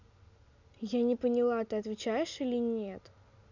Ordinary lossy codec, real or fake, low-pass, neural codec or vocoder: none; real; 7.2 kHz; none